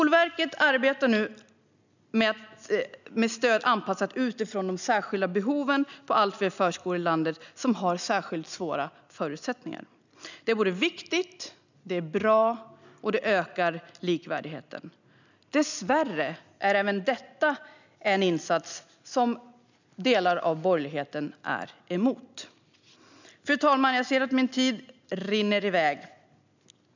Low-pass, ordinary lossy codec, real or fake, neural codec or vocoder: 7.2 kHz; none; real; none